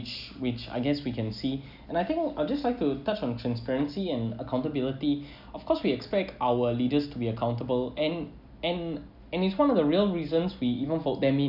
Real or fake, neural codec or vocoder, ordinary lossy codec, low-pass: real; none; none; 5.4 kHz